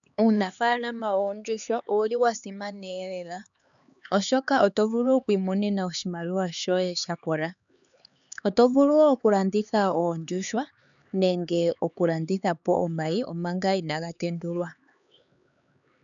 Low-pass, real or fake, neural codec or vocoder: 7.2 kHz; fake; codec, 16 kHz, 4 kbps, X-Codec, HuBERT features, trained on LibriSpeech